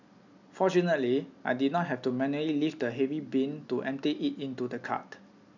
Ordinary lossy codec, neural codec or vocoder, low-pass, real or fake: none; none; 7.2 kHz; real